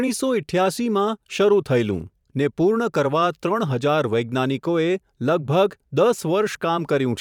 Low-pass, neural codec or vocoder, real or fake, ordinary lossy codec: 19.8 kHz; vocoder, 44.1 kHz, 128 mel bands every 512 samples, BigVGAN v2; fake; none